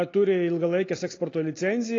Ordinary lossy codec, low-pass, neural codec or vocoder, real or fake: AAC, 32 kbps; 7.2 kHz; none; real